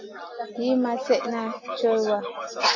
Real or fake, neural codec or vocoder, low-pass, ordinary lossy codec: real; none; 7.2 kHz; AAC, 32 kbps